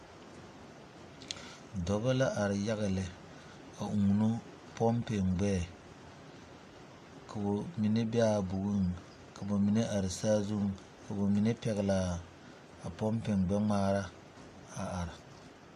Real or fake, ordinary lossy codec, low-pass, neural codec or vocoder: real; MP3, 64 kbps; 14.4 kHz; none